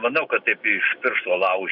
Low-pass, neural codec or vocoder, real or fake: 5.4 kHz; none; real